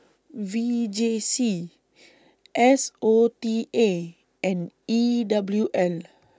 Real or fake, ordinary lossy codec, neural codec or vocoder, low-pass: real; none; none; none